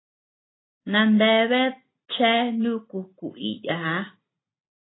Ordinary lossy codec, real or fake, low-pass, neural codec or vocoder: AAC, 16 kbps; real; 7.2 kHz; none